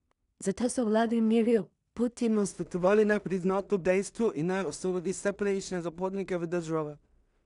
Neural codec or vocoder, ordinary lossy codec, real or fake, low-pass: codec, 16 kHz in and 24 kHz out, 0.4 kbps, LongCat-Audio-Codec, two codebook decoder; none; fake; 10.8 kHz